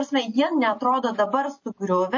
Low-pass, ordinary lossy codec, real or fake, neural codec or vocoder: 7.2 kHz; MP3, 32 kbps; real; none